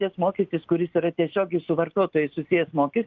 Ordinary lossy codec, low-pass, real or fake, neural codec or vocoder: Opus, 32 kbps; 7.2 kHz; real; none